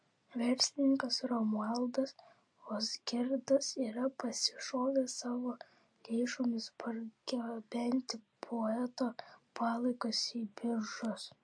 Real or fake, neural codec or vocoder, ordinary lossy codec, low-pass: real; none; MP3, 48 kbps; 9.9 kHz